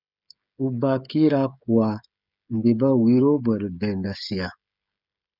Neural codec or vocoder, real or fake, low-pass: codec, 16 kHz, 16 kbps, FreqCodec, smaller model; fake; 5.4 kHz